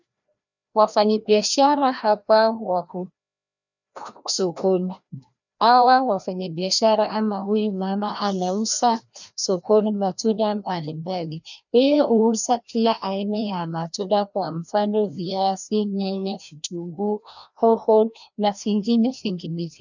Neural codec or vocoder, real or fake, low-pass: codec, 16 kHz, 1 kbps, FreqCodec, larger model; fake; 7.2 kHz